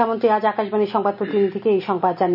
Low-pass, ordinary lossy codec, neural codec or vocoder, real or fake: 5.4 kHz; none; none; real